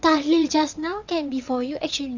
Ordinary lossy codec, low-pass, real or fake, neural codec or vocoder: none; 7.2 kHz; fake; vocoder, 22.05 kHz, 80 mel bands, WaveNeXt